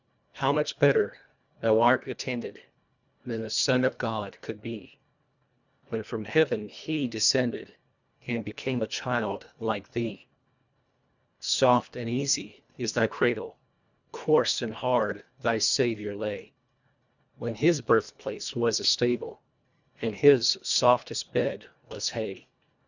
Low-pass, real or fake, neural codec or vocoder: 7.2 kHz; fake; codec, 24 kHz, 1.5 kbps, HILCodec